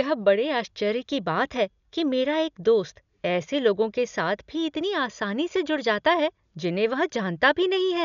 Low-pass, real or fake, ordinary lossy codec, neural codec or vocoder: 7.2 kHz; real; none; none